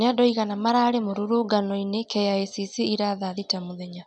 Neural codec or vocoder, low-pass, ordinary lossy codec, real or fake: none; 9.9 kHz; none; real